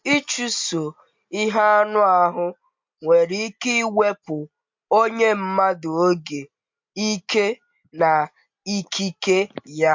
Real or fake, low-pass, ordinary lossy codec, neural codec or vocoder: real; 7.2 kHz; MP3, 48 kbps; none